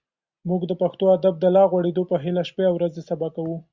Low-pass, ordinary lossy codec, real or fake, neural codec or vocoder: 7.2 kHz; Opus, 64 kbps; real; none